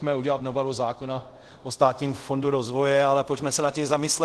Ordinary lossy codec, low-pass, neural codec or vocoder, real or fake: Opus, 16 kbps; 10.8 kHz; codec, 24 kHz, 0.5 kbps, DualCodec; fake